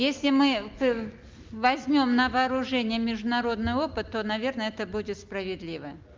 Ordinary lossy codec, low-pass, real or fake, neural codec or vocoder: Opus, 32 kbps; 7.2 kHz; real; none